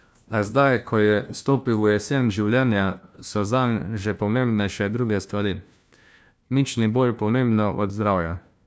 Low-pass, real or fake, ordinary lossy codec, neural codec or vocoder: none; fake; none; codec, 16 kHz, 1 kbps, FunCodec, trained on LibriTTS, 50 frames a second